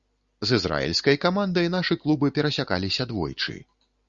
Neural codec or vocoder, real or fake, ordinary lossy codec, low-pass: none; real; Opus, 64 kbps; 7.2 kHz